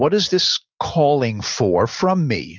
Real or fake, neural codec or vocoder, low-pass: real; none; 7.2 kHz